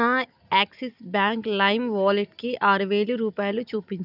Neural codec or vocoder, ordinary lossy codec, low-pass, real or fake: none; none; 5.4 kHz; real